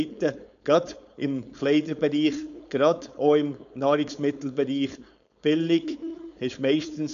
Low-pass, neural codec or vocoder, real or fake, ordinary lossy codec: 7.2 kHz; codec, 16 kHz, 4.8 kbps, FACodec; fake; none